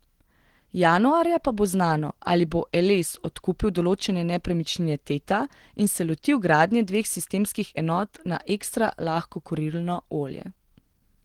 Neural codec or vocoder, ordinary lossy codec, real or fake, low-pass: none; Opus, 16 kbps; real; 19.8 kHz